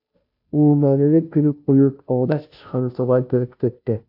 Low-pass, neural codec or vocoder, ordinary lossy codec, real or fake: 5.4 kHz; codec, 16 kHz, 0.5 kbps, FunCodec, trained on Chinese and English, 25 frames a second; AAC, 48 kbps; fake